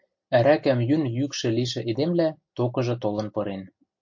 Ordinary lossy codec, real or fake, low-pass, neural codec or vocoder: MP3, 48 kbps; real; 7.2 kHz; none